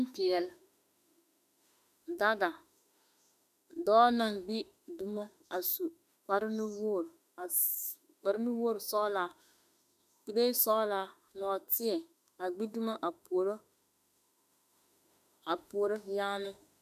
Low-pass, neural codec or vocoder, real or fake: 14.4 kHz; autoencoder, 48 kHz, 32 numbers a frame, DAC-VAE, trained on Japanese speech; fake